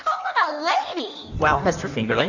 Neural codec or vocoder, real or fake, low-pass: codec, 16 kHz in and 24 kHz out, 1.1 kbps, FireRedTTS-2 codec; fake; 7.2 kHz